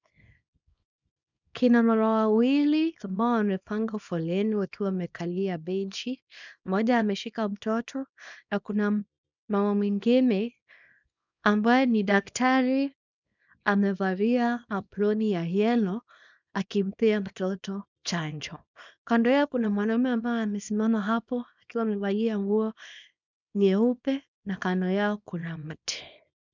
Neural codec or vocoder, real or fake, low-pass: codec, 24 kHz, 0.9 kbps, WavTokenizer, small release; fake; 7.2 kHz